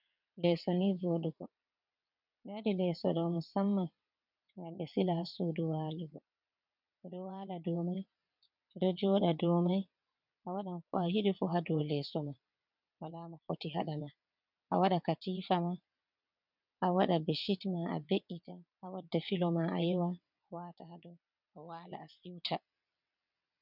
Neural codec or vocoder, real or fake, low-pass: vocoder, 22.05 kHz, 80 mel bands, WaveNeXt; fake; 5.4 kHz